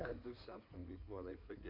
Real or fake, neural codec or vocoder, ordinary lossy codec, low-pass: fake; codec, 16 kHz in and 24 kHz out, 2.2 kbps, FireRedTTS-2 codec; MP3, 48 kbps; 5.4 kHz